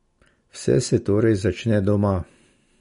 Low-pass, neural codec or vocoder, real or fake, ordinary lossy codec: 10.8 kHz; none; real; MP3, 48 kbps